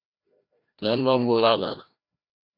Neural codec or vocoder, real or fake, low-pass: codec, 16 kHz, 1 kbps, FreqCodec, larger model; fake; 5.4 kHz